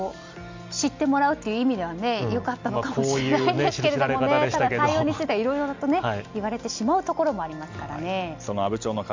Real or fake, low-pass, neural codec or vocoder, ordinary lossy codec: real; 7.2 kHz; none; MP3, 64 kbps